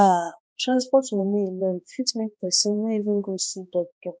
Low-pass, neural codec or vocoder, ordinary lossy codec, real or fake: none; codec, 16 kHz, 1 kbps, X-Codec, HuBERT features, trained on balanced general audio; none; fake